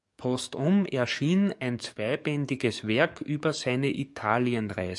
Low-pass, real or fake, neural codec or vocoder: 10.8 kHz; fake; codec, 44.1 kHz, 7.8 kbps, DAC